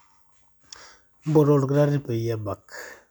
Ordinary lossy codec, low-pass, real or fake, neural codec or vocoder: none; none; real; none